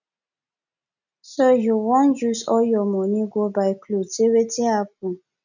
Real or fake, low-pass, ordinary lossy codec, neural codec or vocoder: real; 7.2 kHz; none; none